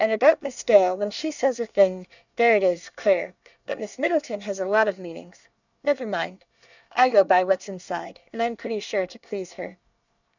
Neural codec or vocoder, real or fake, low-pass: codec, 24 kHz, 1 kbps, SNAC; fake; 7.2 kHz